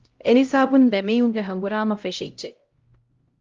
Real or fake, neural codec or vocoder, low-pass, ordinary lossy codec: fake; codec, 16 kHz, 0.5 kbps, X-Codec, HuBERT features, trained on LibriSpeech; 7.2 kHz; Opus, 32 kbps